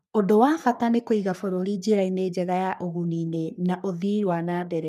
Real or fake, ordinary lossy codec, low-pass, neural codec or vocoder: fake; none; 14.4 kHz; codec, 44.1 kHz, 3.4 kbps, Pupu-Codec